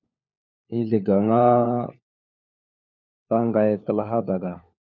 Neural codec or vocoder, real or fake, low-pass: codec, 16 kHz, 4 kbps, FunCodec, trained on LibriTTS, 50 frames a second; fake; 7.2 kHz